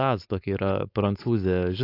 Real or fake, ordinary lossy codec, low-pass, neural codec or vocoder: fake; AAC, 32 kbps; 5.4 kHz; codec, 16 kHz, 4.8 kbps, FACodec